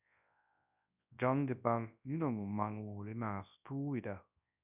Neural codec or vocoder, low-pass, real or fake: codec, 24 kHz, 0.9 kbps, WavTokenizer, large speech release; 3.6 kHz; fake